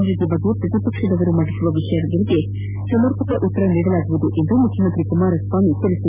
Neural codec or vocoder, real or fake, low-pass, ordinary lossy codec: none; real; 3.6 kHz; none